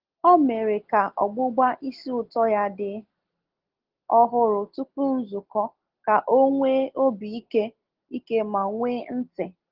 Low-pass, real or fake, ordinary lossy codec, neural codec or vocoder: 5.4 kHz; real; Opus, 16 kbps; none